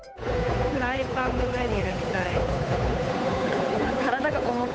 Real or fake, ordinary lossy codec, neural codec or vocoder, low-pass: fake; none; codec, 16 kHz, 8 kbps, FunCodec, trained on Chinese and English, 25 frames a second; none